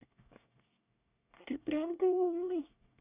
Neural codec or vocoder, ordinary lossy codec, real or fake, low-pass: codec, 24 kHz, 1 kbps, SNAC; none; fake; 3.6 kHz